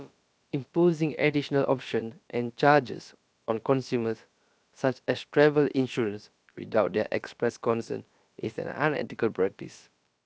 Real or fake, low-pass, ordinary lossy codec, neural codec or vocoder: fake; none; none; codec, 16 kHz, about 1 kbps, DyCAST, with the encoder's durations